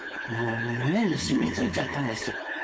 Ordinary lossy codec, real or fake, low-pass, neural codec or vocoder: none; fake; none; codec, 16 kHz, 4.8 kbps, FACodec